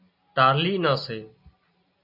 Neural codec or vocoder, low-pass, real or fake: none; 5.4 kHz; real